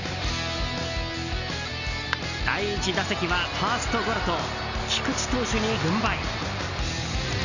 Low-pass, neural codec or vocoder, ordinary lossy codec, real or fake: 7.2 kHz; none; none; real